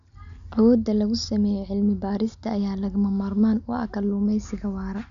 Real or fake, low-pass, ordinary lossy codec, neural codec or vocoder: real; 7.2 kHz; none; none